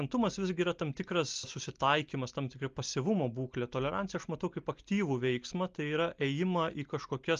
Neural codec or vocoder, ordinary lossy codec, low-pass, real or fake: none; Opus, 32 kbps; 7.2 kHz; real